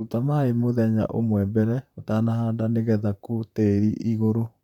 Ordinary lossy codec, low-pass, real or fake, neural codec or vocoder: none; 19.8 kHz; fake; vocoder, 44.1 kHz, 128 mel bands, Pupu-Vocoder